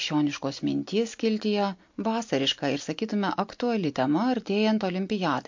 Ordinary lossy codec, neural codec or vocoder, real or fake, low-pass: MP3, 48 kbps; none; real; 7.2 kHz